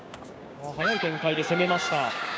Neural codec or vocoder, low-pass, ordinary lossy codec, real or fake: codec, 16 kHz, 6 kbps, DAC; none; none; fake